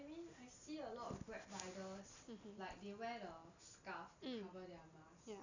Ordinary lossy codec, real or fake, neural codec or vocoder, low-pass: none; real; none; 7.2 kHz